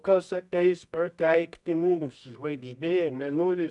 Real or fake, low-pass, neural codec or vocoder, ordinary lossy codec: fake; 10.8 kHz; codec, 24 kHz, 0.9 kbps, WavTokenizer, medium music audio release; MP3, 96 kbps